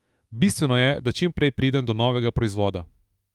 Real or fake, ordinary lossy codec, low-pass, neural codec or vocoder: fake; Opus, 32 kbps; 19.8 kHz; autoencoder, 48 kHz, 32 numbers a frame, DAC-VAE, trained on Japanese speech